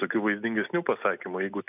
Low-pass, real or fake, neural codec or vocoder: 3.6 kHz; real; none